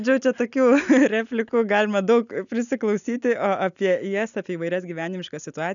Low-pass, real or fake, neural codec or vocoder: 7.2 kHz; real; none